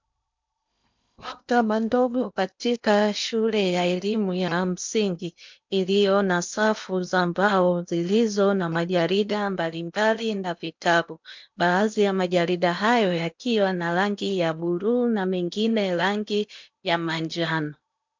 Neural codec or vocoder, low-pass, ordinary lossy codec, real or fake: codec, 16 kHz in and 24 kHz out, 0.8 kbps, FocalCodec, streaming, 65536 codes; 7.2 kHz; MP3, 64 kbps; fake